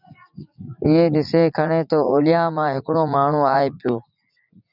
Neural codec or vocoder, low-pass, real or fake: vocoder, 44.1 kHz, 80 mel bands, Vocos; 5.4 kHz; fake